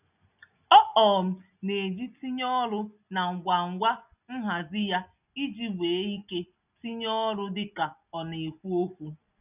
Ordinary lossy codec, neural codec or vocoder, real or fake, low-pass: none; none; real; 3.6 kHz